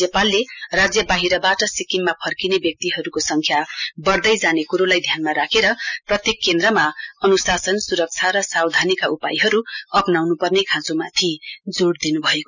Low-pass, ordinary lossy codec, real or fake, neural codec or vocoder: 7.2 kHz; none; real; none